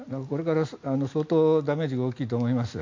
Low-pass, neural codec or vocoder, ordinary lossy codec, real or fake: 7.2 kHz; none; MP3, 48 kbps; real